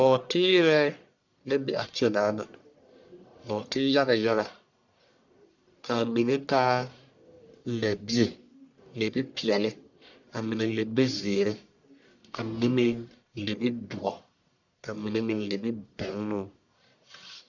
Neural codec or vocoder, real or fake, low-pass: codec, 44.1 kHz, 1.7 kbps, Pupu-Codec; fake; 7.2 kHz